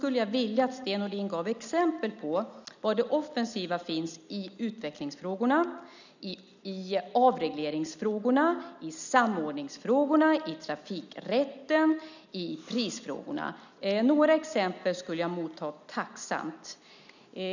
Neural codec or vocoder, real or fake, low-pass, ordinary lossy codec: none; real; 7.2 kHz; none